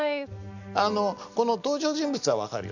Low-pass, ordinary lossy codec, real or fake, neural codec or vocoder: 7.2 kHz; none; real; none